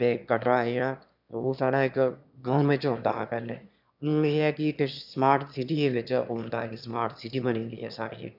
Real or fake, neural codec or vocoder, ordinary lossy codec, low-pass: fake; autoencoder, 22.05 kHz, a latent of 192 numbers a frame, VITS, trained on one speaker; none; 5.4 kHz